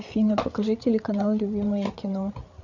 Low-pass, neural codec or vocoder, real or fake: 7.2 kHz; codec, 16 kHz, 8 kbps, FreqCodec, larger model; fake